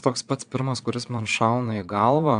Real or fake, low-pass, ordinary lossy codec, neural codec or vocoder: fake; 9.9 kHz; AAC, 96 kbps; vocoder, 22.05 kHz, 80 mel bands, Vocos